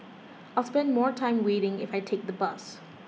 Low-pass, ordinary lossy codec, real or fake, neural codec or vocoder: none; none; real; none